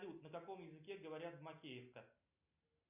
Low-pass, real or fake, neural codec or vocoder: 3.6 kHz; real; none